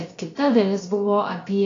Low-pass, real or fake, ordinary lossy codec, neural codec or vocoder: 7.2 kHz; fake; AAC, 32 kbps; codec, 16 kHz, about 1 kbps, DyCAST, with the encoder's durations